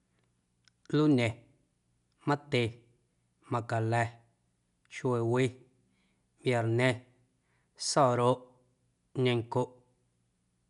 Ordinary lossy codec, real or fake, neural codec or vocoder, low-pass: none; real; none; 10.8 kHz